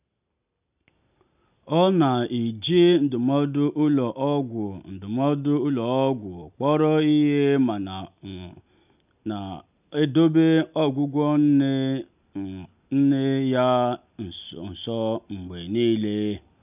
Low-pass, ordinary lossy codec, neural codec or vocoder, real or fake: 3.6 kHz; none; none; real